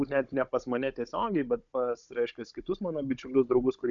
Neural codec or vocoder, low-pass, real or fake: codec, 16 kHz, 16 kbps, FunCodec, trained on Chinese and English, 50 frames a second; 7.2 kHz; fake